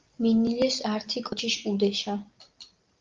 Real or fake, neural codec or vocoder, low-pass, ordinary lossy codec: real; none; 7.2 kHz; Opus, 32 kbps